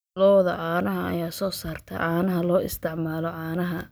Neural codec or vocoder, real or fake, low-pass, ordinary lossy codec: none; real; none; none